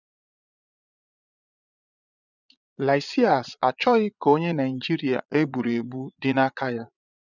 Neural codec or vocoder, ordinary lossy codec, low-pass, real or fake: vocoder, 22.05 kHz, 80 mel bands, Vocos; none; 7.2 kHz; fake